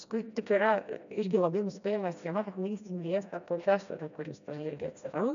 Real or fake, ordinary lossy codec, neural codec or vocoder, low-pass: fake; MP3, 96 kbps; codec, 16 kHz, 1 kbps, FreqCodec, smaller model; 7.2 kHz